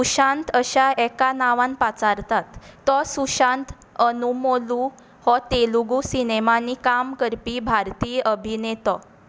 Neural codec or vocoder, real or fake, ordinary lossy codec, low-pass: none; real; none; none